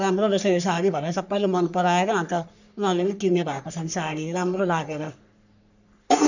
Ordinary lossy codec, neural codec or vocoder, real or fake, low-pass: none; codec, 44.1 kHz, 3.4 kbps, Pupu-Codec; fake; 7.2 kHz